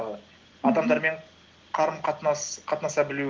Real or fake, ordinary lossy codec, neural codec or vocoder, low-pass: real; Opus, 16 kbps; none; 7.2 kHz